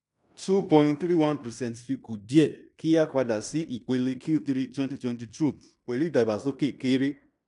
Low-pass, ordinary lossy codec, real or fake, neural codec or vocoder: 10.8 kHz; none; fake; codec, 16 kHz in and 24 kHz out, 0.9 kbps, LongCat-Audio-Codec, fine tuned four codebook decoder